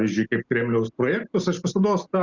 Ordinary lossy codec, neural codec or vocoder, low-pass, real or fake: Opus, 64 kbps; none; 7.2 kHz; real